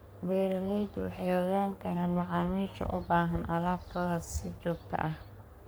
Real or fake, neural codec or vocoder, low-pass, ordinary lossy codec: fake; codec, 44.1 kHz, 3.4 kbps, Pupu-Codec; none; none